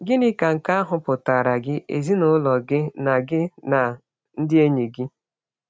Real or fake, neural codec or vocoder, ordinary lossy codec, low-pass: real; none; none; none